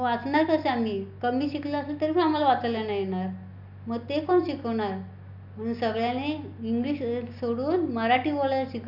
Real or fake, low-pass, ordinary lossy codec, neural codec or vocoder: real; 5.4 kHz; none; none